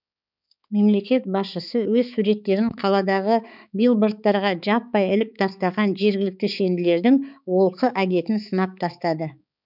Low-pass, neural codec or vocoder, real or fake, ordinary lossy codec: 5.4 kHz; codec, 16 kHz, 4 kbps, X-Codec, HuBERT features, trained on balanced general audio; fake; none